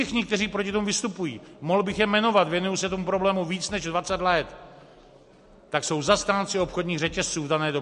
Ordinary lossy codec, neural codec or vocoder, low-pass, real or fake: MP3, 48 kbps; none; 14.4 kHz; real